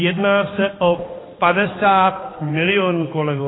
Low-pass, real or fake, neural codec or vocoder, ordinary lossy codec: 7.2 kHz; fake; codec, 16 kHz, 2 kbps, X-Codec, HuBERT features, trained on balanced general audio; AAC, 16 kbps